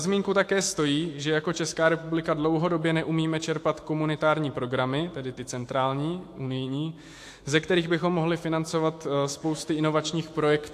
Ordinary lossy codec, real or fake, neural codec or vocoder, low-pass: AAC, 64 kbps; fake; autoencoder, 48 kHz, 128 numbers a frame, DAC-VAE, trained on Japanese speech; 14.4 kHz